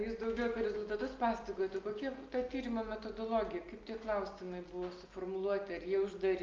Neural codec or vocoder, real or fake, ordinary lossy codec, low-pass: none; real; Opus, 24 kbps; 7.2 kHz